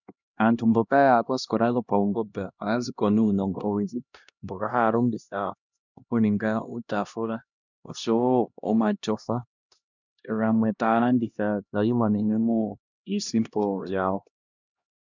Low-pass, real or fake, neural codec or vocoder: 7.2 kHz; fake; codec, 16 kHz, 1 kbps, X-Codec, HuBERT features, trained on LibriSpeech